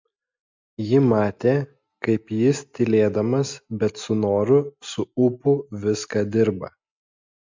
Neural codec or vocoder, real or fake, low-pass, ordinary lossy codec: none; real; 7.2 kHz; MP3, 64 kbps